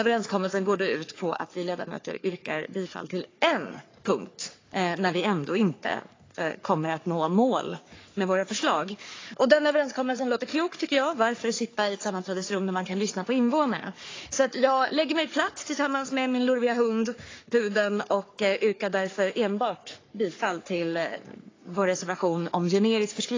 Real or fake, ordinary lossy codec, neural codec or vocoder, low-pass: fake; AAC, 32 kbps; codec, 44.1 kHz, 3.4 kbps, Pupu-Codec; 7.2 kHz